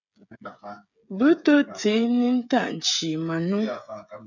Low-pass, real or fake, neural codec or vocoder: 7.2 kHz; fake; codec, 16 kHz, 16 kbps, FreqCodec, smaller model